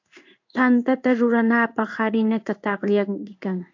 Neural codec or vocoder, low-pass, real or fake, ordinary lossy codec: codec, 16 kHz in and 24 kHz out, 1 kbps, XY-Tokenizer; 7.2 kHz; fake; AAC, 48 kbps